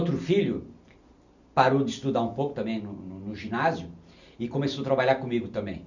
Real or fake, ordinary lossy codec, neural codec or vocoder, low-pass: real; none; none; 7.2 kHz